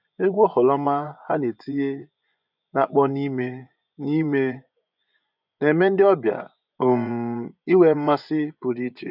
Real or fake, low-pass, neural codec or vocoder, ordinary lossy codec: fake; 5.4 kHz; vocoder, 24 kHz, 100 mel bands, Vocos; none